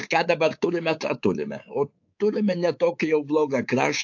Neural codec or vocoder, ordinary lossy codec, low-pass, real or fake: codec, 24 kHz, 3.1 kbps, DualCodec; AAC, 48 kbps; 7.2 kHz; fake